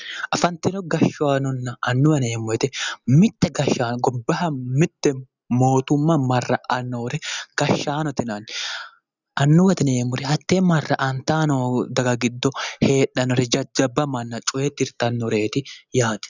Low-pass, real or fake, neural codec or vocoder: 7.2 kHz; real; none